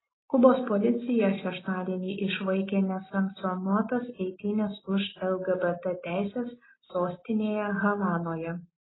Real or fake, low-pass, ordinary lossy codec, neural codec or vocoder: real; 7.2 kHz; AAC, 16 kbps; none